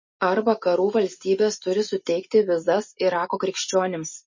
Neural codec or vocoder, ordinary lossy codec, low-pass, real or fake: none; MP3, 32 kbps; 7.2 kHz; real